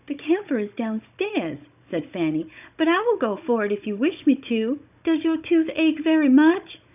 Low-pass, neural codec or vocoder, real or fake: 3.6 kHz; codec, 16 kHz, 16 kbps, FunCodec, trained on Chinese and English, 50 frames a second; fake